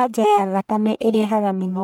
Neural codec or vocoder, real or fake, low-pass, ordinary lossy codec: codec, 44.1 kHz, 1.7 kbps, Pupu-Codec; fake; none; none